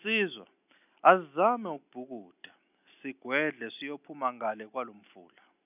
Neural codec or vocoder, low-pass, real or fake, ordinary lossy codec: none; 3.6 kHz; real; none